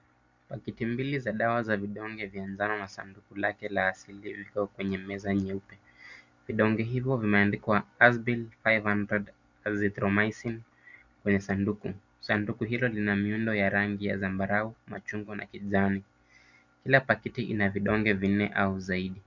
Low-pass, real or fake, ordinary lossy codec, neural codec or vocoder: 7.2 kHz; real; Opus, 64 kbps; none